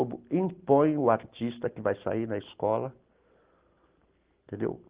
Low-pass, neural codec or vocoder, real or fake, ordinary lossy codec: 3.6 kHz; none; real; Opus, 16 kbps